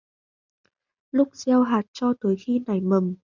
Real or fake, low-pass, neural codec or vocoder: real; 7.2 kHz; none